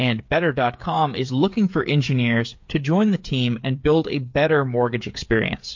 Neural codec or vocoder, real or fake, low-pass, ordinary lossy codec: codec, 16 kHz, 8 kbps, FreqCodec, smaller model; fake; 7.2 kHz; MP3, 48 kbps